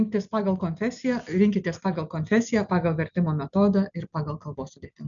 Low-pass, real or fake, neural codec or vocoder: 7.2 kHz; real; none